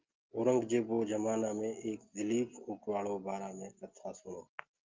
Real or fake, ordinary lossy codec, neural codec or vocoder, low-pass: real; Opus, 24 kbps; none; 7.2 kHz